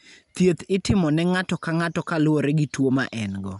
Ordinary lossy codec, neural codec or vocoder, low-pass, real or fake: none; none; 10.8 kHz; real